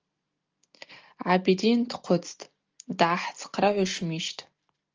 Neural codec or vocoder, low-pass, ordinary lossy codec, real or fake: none; 7.2 kHz; Opus, 24 kbps; real